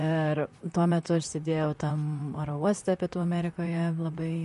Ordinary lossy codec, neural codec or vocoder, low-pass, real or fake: MP3, 48 kbps; vocoder, 44.1 kHz, 128 mel bands, Pupu-Vocoder; 14.4 kHz; fake